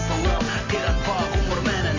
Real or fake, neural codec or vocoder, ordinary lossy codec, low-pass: real; none; AAC, 32 kbps; 7.2 kHz